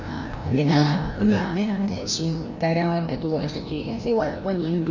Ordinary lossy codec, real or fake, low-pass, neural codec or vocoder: none; fake; 7.2 kHz; codec, 16 kHz, 1 kbps, FreqCodec, larger model